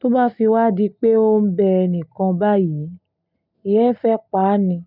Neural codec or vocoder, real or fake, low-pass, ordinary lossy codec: none; real; 5.4 kHz; none